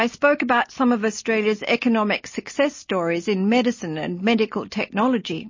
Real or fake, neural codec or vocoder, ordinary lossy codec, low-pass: real; none; MP3, 32 kbps; 7.2 kHz